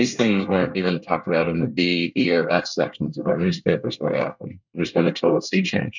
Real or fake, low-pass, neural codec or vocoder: fake; 7.2 kHz; codec, 24 kHz, 1 kbps, SNAC